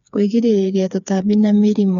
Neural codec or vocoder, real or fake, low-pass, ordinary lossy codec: codec, 16 kHz, 4 kbps, FreqCodec, smaller model; fake; 7.2 kHz; MP3, 96 kbps